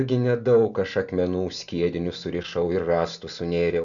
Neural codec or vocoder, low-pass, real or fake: none; 7.2 kHz; real